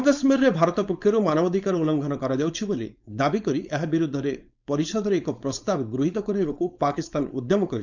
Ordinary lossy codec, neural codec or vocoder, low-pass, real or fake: none; codec, 16 kHz, 4.8 kbps, FACodec; 7.2 kHz; fake